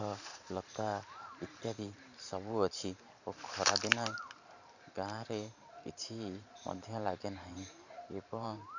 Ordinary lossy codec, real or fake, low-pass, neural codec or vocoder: none; fake; 7.2 kHz; vocoder, 44.1 kHz, 128 mel bands every 512 samples, BigVGAN v2